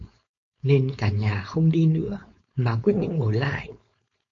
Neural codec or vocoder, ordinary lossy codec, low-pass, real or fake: codec, 16 kHz, 4.8 kbps, FACodec; MP3, 48 kbps; 7.2 kHz; fake